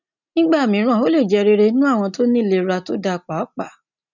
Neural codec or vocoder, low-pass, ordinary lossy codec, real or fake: none; 7.2 kHz; none; real